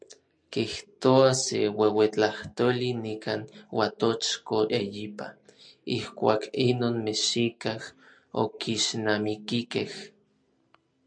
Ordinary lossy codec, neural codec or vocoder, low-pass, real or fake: MP3, 64 kbps; none; 9.9 kHz; real